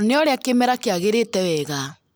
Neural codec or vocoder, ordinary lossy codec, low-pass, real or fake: none; none; none; real